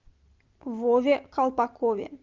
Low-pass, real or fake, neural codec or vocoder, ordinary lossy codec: 7.2 kHz; real; none; Opus, 24 kbps